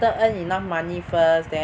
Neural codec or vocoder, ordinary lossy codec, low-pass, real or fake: none; none; none; real